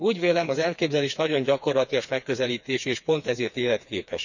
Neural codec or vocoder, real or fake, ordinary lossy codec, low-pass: codec, 16 kHz, 4 kbps, FreqCodec, smaller model; fake; none; 7.2 kHz